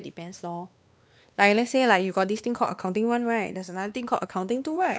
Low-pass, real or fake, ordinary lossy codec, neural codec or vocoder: none; fake; none; codec, 16 kHz, 2 kbps, X-Codec, WavLM features, trained on Multilingual LibriSpeech